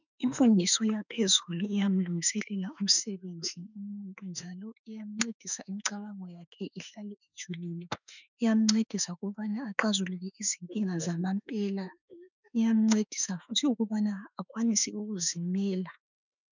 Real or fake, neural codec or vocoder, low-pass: fake; autoencoder, 48 kHz, 32 numbers a frame, DAC-VAE, trained on Japanese speech; 7.2 kHz